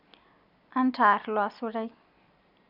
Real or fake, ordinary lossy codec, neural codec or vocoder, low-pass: real; none; none; 5.4 kHz